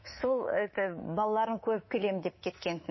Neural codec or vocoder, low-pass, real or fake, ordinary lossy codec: codec, 24 kHz, 3.1 kbps, DualCodec; 7.2 kHz; fake; MP3, 24 kbps